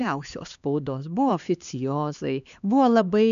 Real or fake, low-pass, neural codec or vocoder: fake; 7.2 kHz; codec, 16 kHz, 2 kbps, X-Codec, HuBERT features, trained on LibriSpeech